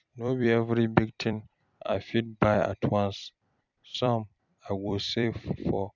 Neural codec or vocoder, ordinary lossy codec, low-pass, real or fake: vocoder, 44.1 kHz, 128 mel bands every 256 samples, BigVGAN v2; none; 7.2 kHz; fake